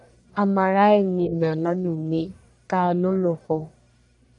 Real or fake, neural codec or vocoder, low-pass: fake; codec, 44.1 kHz, 1.7 kbps, Pupu-Codec; 10.8 kHz